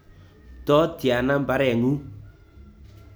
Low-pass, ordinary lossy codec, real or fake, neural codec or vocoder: none; none; real; none